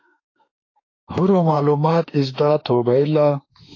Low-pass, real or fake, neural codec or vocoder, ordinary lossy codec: 7.2 kHz; fake; autoencoder, 48 kHz, 32 numbers a frame, DAC-VAE, trained on Japanese speech; AAC, 32 kbps